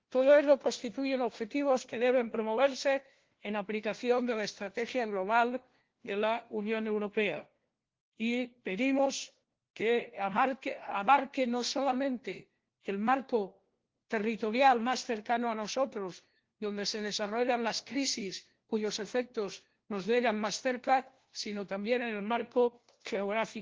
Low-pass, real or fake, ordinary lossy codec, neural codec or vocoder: 7.2 kHz; fake; Opus, 16 kbps; codec, 16 kHz, 1 kbps, FunCodec, trained on LibriTTS, 50 frames a second